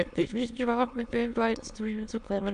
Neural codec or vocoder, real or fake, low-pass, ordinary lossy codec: autoencoder, 22.05 kHz, a latent of 192 numbers a frame, VITS, trained on many speakers; fake; 9.9 kHz; AAC, 96 kbps